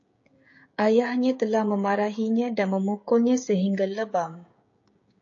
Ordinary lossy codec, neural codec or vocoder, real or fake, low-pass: AAC, 48 kbps; codec, 16 kHz, 16 kbps, FreqCodec, smaller model; fake; 7.2 kHz